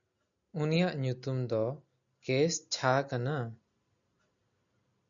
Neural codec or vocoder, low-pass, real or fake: none; 7.2 kHz; real